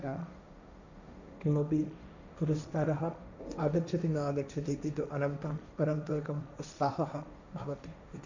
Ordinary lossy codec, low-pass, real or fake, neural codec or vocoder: none; 7.2 kHz; fake; codec, 16 kHz, 1.1 kbps, Voila-Tokenizer